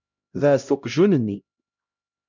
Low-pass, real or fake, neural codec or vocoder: 7.2 kHz; fake; codec, 16 kHz, 0.5 kbps, X-Codec, HuBERT features, trained on LibriSpeech